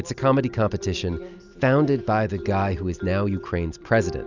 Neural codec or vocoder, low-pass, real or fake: none; 7.2 kHz; real